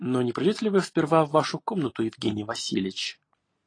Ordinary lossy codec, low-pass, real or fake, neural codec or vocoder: AAC, 32 kbps; 9.9 kHz; real; none